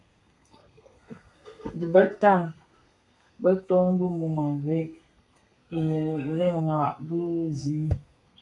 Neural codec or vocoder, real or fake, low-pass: codec, 32 kHz, 1.9 kbps, SNAC; fake; 10.8 kHz